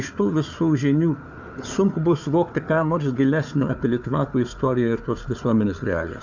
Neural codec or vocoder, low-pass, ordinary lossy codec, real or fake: codec, 16 kHz, 4 kbps, FunCodec, trained on Chinese and English, 50 frames a second; 7.2 kHz; MP3, 64 kbps; fake